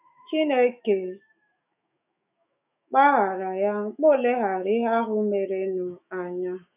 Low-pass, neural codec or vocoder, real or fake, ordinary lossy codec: 3.6 kHz; autoencoder, 48 kHz, 128 numbers a frame, DAC-VAE, trained on Japanese speech; fake; none